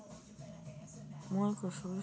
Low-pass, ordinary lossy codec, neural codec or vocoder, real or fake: none; none; none; real